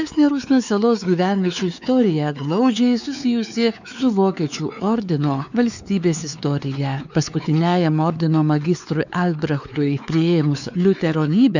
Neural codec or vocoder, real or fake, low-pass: codec, 16 kHz, 4 kbps, X-Codec, WavLM features, trained on Multilingual LibriSpeech; fake; 7.2 kHz